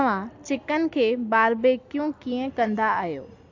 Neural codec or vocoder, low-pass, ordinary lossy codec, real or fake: none; 7.2 kHz; AAC, 48 kbps; real